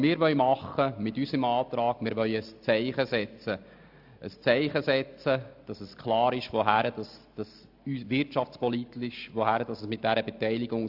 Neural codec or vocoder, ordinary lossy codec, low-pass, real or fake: none; AAC, 48 kbps; 5.4 kHz; real